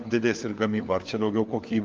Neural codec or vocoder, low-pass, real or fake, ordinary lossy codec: codec, 16 kHz, 4 kbps, FunCodec, trained on LibriTTS, 50 frames a second; 7.2 kHz; fake; Opus, 32 kbps